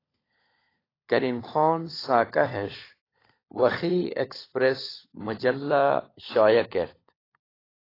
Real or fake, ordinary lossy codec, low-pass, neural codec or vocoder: fake; AAC, 24 kbps; 5.4 kHz; codec, 16 kHz, 16 kbps, FunCodec, trained on LibriTTS, 50 frames a second